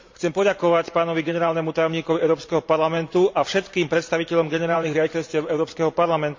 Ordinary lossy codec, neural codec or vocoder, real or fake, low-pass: MP3, 64 kbps; vocoder, 44.1 kHz, 128 mel bands every 512 samples, BigVGAN v2; fake; 7.2 kHz